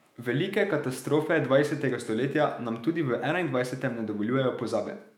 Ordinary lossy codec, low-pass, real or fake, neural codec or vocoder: MP3, 96 kbps; 19.8 kHz; fake; autoencoder, 48 kHz, 128 numbers a frame, DAC-VAE, trained on Japanese speech